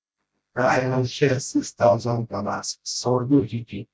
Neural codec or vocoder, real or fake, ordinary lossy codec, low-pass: codec, 16 kHz, 0.5 kbps, FreqCodec, smaller model; fake; none; none